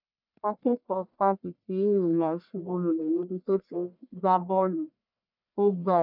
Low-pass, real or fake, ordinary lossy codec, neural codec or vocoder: 5.4 kHz; fake; none; codec, 44.1 kHz, 1.7 kbps, Pupu-Codec